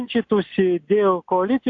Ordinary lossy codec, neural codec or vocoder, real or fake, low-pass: AAC, 64 kbps; none; real; 7.2 kHz